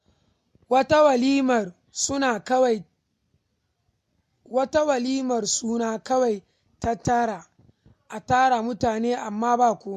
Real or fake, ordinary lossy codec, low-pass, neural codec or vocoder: real; MP3, 64 kbps; 14.4 kHz; none